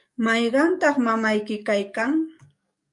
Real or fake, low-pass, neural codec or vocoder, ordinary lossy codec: fake; 10.8 kHz; codec, 44.1 kHz, 7.8 kbps, DAC; MP3, 64 kbps